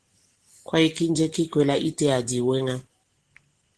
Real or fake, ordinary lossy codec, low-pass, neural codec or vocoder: real; Opus, 16 kbps; 10.8 kHz; none